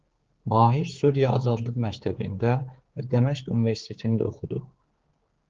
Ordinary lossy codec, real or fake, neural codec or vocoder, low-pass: Opus, 16 kbps; fake; codec, 16 kHz, 4 kbps, X-Codec, HuBERT features, trained on general audio; 7.2 kHz